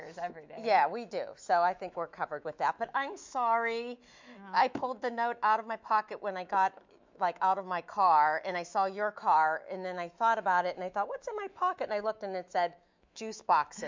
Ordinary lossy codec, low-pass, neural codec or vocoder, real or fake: MP3, 64 kbps; 7.2 kHz; autoencoder, 48 kHz, 128 numbers a frame, DAC-VAE, trained on Japanese speech; fake